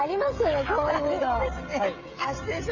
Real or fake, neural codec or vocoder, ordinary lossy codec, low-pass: fake; codec, 16 kHz, 16 kbps, FreqCodec, smaller model; none; 7.2 kHz